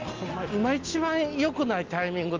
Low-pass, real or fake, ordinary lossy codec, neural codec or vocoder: 7.2 kHz; real; Opus, 24 kbps; none